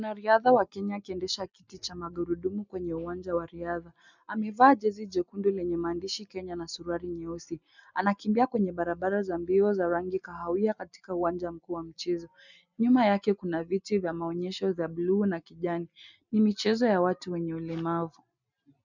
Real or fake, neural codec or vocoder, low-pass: real; none; 7.2 kHz